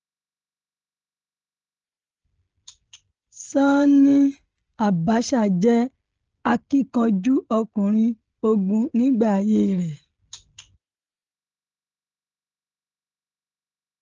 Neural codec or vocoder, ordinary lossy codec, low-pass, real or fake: codec, 16 kHz, 16 kbps, FreqCodec, smaller model; Opus, 16 kbps; 7.2 kHz; fake